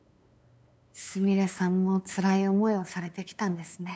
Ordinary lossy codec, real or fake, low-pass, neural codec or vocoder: none; fake; none; codec, 16 kHz, 8 kbps, FunCodec, trained on LibriTTS, 25 frames a second